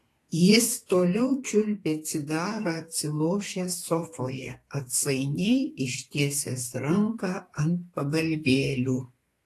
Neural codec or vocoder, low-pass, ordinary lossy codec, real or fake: codec, 32 kHz, 1.9 kbps, SNAC; 14.4 kHz; AAC, 48 kbps; fake